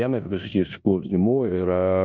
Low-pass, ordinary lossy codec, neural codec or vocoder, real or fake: 7.2 kHz; MP3, 64 kbps; codec, 16 kHz in and 24 kHz out, 0.9 kbps, LongCat-Audio-Codec, four codebook decoder; fake